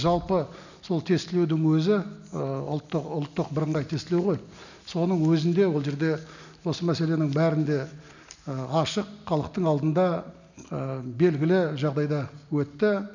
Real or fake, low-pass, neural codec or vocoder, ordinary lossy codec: real; 7.2 kHz; none; none